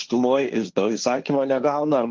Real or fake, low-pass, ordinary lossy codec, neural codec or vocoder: fake; 7.2 kHz; Opus, 32 kbps; codec, 16 kHz, 1.1 kbps, Voila-Tokenizer